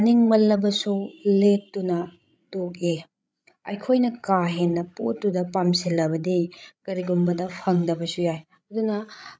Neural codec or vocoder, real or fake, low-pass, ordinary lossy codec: codec, 16 kHz, 16 kbps, FreqCodec, larger model; fake; none; none